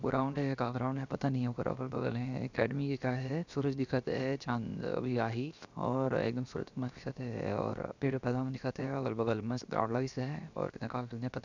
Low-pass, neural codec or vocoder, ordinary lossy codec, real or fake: 7.2 kHz; codec, 16 kHz, 0.7 kbps, FocalCodec; none; fake